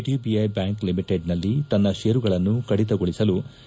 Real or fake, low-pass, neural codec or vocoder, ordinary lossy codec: real; none; none; none